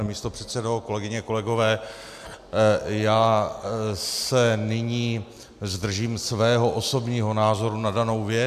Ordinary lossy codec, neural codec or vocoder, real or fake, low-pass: MP3, 96 kbps; none; real; 14.4 kHz